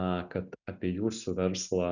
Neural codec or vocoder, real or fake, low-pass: none; real; 7.2 kHz